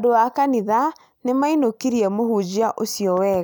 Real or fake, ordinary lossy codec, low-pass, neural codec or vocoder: real; none; none; none